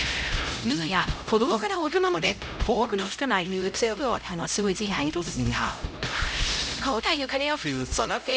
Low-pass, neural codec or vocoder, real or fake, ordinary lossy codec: none; codec, 16 kHz, 0.5 kbps, X-Codec, HuBERT features, trained on LibriSpeech; fake; none